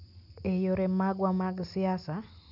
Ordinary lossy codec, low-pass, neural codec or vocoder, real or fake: none; 5.4 kHz; none; real